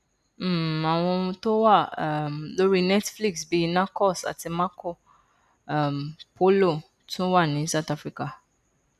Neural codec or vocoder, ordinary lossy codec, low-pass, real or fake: none; none; 14.4 kHz; real